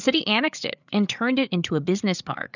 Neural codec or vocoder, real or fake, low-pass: codec, 16 kHz, 8 kbps, FreqCodec, larger model; fake; 7.2 kHz